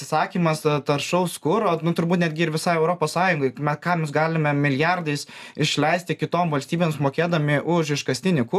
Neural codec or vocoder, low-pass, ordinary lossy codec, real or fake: none; 14.4 kHz; AAC, 96 kbps; real